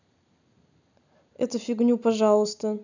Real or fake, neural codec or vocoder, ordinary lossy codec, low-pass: real; none; none; 7.2 kHz